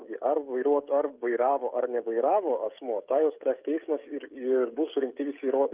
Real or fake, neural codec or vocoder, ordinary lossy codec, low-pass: fake; codec, 16 kHz, 16 kbps, FreqCodec, smaller model; Opus, 24 kbps; 3.6 kHz